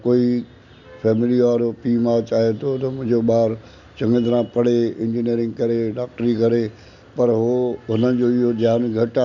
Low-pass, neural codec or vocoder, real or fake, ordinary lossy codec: 7.2 kHz; none; real; none